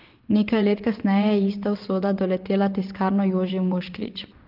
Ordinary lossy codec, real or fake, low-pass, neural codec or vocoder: Opus, 32 kbps; fake; 5.4 kHz; vocoder, 22.05 kHz, 80 mel bands, WaveNeXt